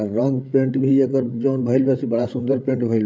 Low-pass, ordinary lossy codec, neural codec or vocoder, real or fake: none; none; codec, 16 kHz, 16 kbps, FreqCodec, larger model; fake